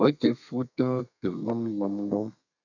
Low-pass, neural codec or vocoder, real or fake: 7.2 kHz; codec, 32 kHz, 1.9 kbps, SNAC; fake